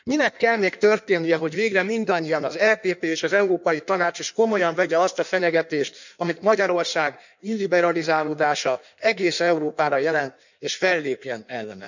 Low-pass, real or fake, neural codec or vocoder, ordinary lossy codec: 7.2 kHz; fake; codec, 16 kHz in and 24 kHz out, 1.1 kbps, FireRedTTS-2 codec; none